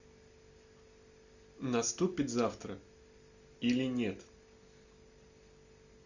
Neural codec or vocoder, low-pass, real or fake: none; 7.2 kHz; real